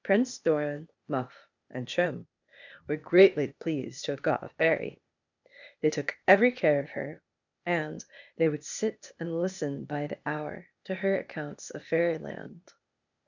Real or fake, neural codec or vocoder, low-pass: fake; codec, 16 kHz, 0.8 kbps, ZipCodec; 7.2 kHz